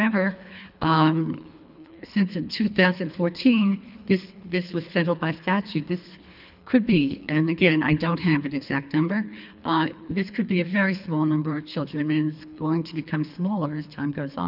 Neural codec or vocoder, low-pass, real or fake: codec, 24 kHz, 3 kbps, HILCodec; 5.4 kHz; fake